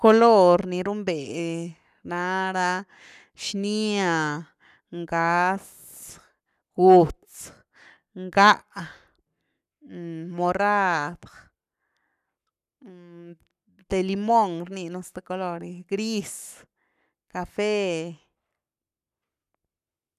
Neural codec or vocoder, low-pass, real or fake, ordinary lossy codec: codec, 44.1 kHz, 7.8 kbps, Pupu-Codec; 14.4 kHz; fake; none